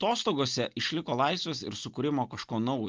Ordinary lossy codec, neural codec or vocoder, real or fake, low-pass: Opus, 32 kbps; none; real; 7.2 kHz